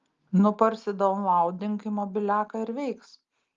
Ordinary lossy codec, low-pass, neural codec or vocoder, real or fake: Opus, 32 kbps; 7.2 kHz; none; real